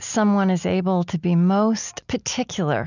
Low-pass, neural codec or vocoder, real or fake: 7.2 kHz; none; real